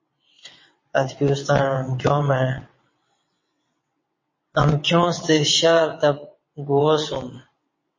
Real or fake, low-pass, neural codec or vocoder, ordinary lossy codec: fake; 7.2 kHz; vocoder, 22.05 kHz, 80 mel bands, WaveNeXt; MP3, 32 kbps